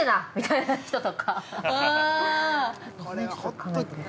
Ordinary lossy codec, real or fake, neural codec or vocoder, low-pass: none; real; none; none